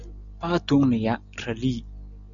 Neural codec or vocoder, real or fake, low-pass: none; real; 7.2 kHz